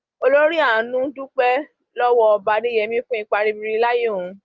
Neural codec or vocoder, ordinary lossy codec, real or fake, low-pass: none; Opus, 32 kbps; real; 7.2 kHz